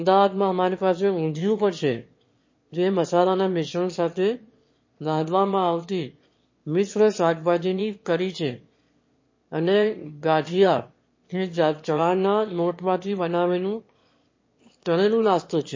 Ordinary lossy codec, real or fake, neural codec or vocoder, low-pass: MP3, 32 kbps; fake; autoencoder, 22.05 kHz, a latent of 192 numbers a frame, VITS, trained on one speaker; 7.2 kHz